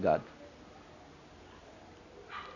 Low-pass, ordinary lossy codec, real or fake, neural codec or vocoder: 7.2 kHz; none; real; none